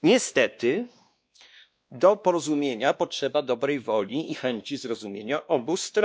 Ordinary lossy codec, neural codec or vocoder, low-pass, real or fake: none; codec, 16 kHz, 2 kbps, X-Codec, WavLM features, trained on Multilingual LibriSpeech; none; fake